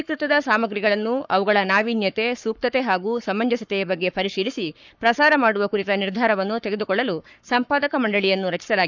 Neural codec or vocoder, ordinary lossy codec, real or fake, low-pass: codec, 44.1 kHz, 7.8 kbps, Pupu-Codec; none; fake; 7.2 kHz